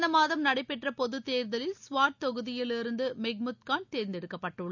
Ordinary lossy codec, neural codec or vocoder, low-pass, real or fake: none; none; 7.2 kHz; real